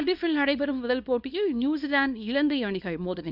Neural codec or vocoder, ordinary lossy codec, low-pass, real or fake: codec, 24 kHz, 0.9 kbps, WavTokenizer, small release; none; 5.4 kHz; fake